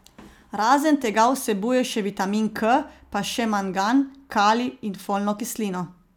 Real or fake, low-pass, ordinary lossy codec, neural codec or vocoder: real; 19.8 kHz; none; none